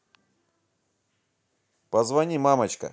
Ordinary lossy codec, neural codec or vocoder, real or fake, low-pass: none; none; real; none